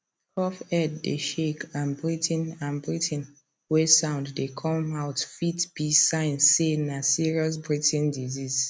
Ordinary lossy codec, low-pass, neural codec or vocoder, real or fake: none; none; none; real